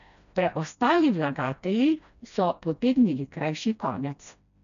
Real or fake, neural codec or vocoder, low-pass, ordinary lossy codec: fake; codec, 16 kHz, 1 kbps, FreqCodec, smaller model; 7.2 kHz; none